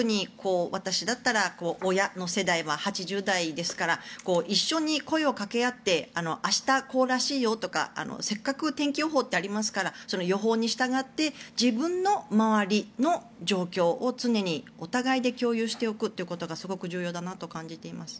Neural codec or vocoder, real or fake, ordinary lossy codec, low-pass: none; real; none; none